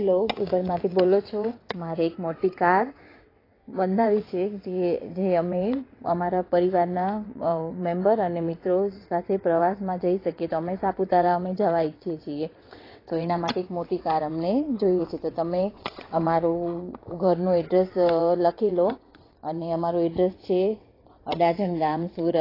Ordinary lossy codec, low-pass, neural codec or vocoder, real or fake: AAC, 24 kbps; 5.4 kHz; vocoder, 22.05 kHz, 80 mel bands, WaveNeXt; fake